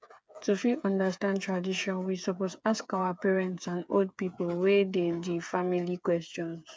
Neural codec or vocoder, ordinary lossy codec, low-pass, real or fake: codec, 16 kHz, 8 kbps, FreqCodec, smaller model; none; none; fake